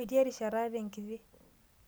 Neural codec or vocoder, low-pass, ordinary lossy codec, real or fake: none; none; none; real